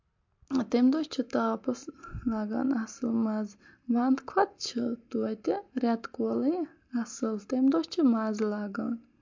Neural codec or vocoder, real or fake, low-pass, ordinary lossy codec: none; real; 7.2 kHz; MP3, 48 kbps